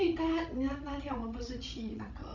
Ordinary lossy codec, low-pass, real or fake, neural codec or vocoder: none; 7.2 kHz; fake; codec, 16 kHz, 16 kbps, FreqCodec, larger model